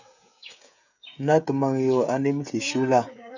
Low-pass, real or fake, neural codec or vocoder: 7.2 kHz; fake; codec, 16 kHz, 16 kbps, FreqCodec, smaller model